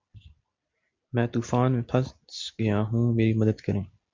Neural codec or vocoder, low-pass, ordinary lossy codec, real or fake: none; 7.2 kHz; MP3, 64 kbps; real